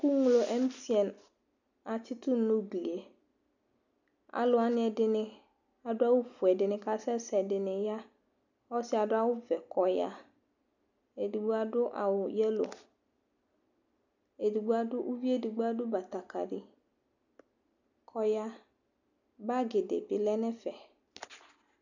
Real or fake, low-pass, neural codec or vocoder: real; 7.2 kHz; none